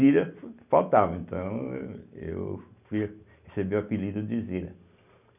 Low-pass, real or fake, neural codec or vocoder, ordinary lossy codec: 3.6 kHz; real; none; none